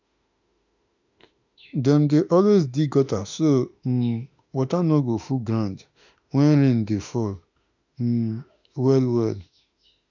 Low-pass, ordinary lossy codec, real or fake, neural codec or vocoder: 7.2 kHz; none; fake; autoencoder, 48 kHz, 32 numbers a frame, DAC-VAE, trained on Japanese speech